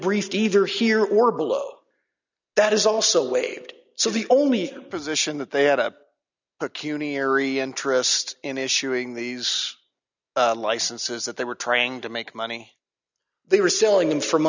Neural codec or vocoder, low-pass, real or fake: none; 7.2 kHz; real